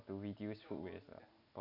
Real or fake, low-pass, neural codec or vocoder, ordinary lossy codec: real; 5.4 kHz; none; none